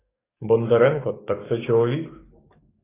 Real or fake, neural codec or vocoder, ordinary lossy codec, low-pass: fake; codec, 44.1 kHz, 7.8 kbps, DAC; AAC, 16 kbps; 3.6 kHz